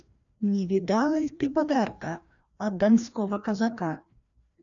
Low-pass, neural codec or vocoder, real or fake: 7.2 kHz; codec, 16 kHz, 1 kbps, FreqCodec, larger model; fake